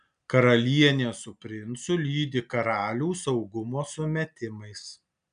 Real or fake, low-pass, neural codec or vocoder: real; 9.9 kHz; none